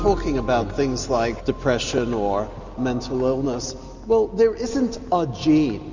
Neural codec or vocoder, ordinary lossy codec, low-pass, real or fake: none; Opus, 64 kbps; 7.2 kHz; real